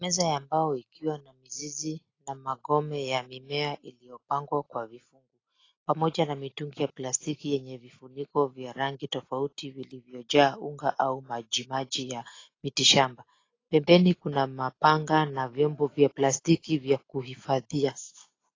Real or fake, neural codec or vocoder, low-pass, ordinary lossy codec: real; none; 7.2 kHz; AAC, 32 kbps